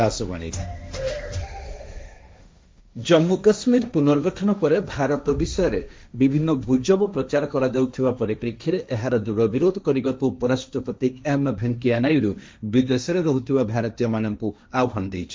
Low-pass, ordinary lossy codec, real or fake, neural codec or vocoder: none; none; fake; codec, 16 kHz, 1.1 kbps, Voila-Tokenizer